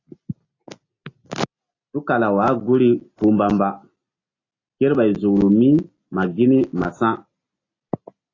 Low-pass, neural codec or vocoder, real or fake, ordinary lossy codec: 7.2 kHz; none; real; AAC, 32 kbps